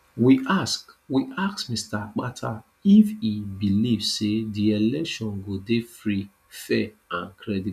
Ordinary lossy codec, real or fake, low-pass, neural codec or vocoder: none; real; 14.4 kHz; none